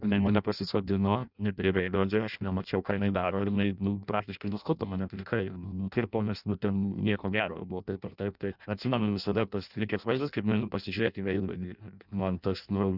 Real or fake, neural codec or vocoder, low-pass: fake; codec, 16 kHz in and 24 kHz out, 0.6 kbps, FireRedTTS-2 codec; 5.4 kHz